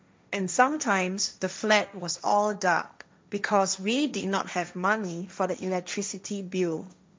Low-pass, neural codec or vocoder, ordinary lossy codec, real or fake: none; codec, 16 kHz, 1.1 kbps, Voila-Tokenizer; none; fake